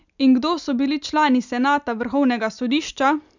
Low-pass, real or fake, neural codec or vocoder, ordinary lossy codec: 7.2 kHz; real; none; none